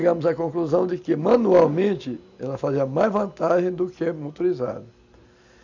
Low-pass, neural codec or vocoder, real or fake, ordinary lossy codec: 7.2 kHz; none; real; none